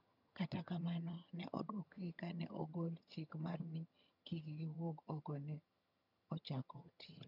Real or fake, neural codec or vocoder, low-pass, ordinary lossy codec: fake; vocoder, 22.05 kHz, 80 mel bands, HiFi-GAN; 5.4 kHz; none